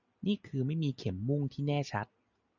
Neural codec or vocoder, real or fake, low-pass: none; real; 7.2 kHz